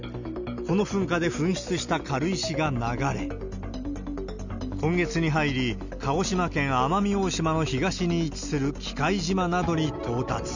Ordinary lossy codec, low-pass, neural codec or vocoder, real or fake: none; 7.2 kHz; none; real